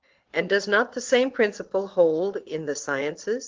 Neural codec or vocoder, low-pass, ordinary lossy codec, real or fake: vocoder, 22.05 kHz, 80 mel bands, Vocos; 7.2 kHz; Opus, 16 kbps; fake